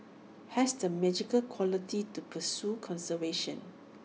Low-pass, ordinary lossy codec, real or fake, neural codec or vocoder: none; none; real; none